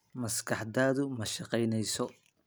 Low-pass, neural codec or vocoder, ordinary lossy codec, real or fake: none; none; none; real